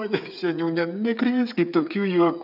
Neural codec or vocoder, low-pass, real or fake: codec, 16 kHz, 8 kbps, FreqCodec, smaller model; 5.4 kHz; fake